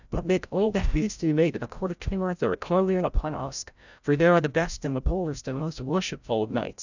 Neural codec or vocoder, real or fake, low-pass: codec, 16 kHz, 0.5 kbps, FreqCodec, larger model; fake; 7.2 kHz